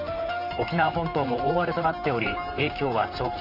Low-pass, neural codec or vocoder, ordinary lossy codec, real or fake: 5.4 kHz; vocoder, 44.1 kHz, 128 mel bands, Pupu-Vocoder; none; fake